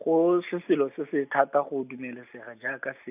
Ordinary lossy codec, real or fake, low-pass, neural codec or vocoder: none; real; 3.6 kHz; none